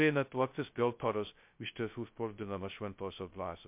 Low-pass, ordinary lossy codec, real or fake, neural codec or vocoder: 3.6 kHz; MP3, 32 kbps; fake; codec, 16 kHz, 0.2 kbps, FocalCodec